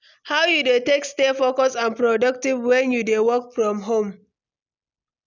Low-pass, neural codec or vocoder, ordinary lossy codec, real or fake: 7.2 kHz; none; none; real